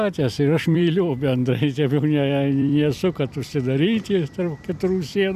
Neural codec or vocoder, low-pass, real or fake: vocoder, 44.1 kHz, 128 mel bands every 256 samples, BigVGAN v2; 14.4 kHz; fake